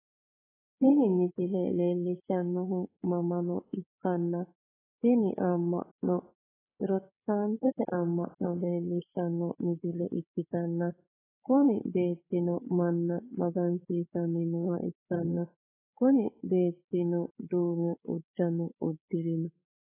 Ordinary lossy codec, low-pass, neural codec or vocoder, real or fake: AAC, 16 kbps; 3.6 kHz; codec, 16 kHz, 8 kbps, FreqCodec, larger model; fake